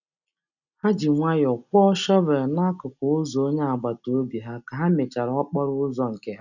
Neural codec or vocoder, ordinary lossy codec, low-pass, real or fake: none; none; 7.2 kHz; real